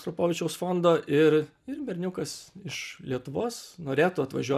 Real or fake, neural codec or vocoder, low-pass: real; none; 14.4 kHz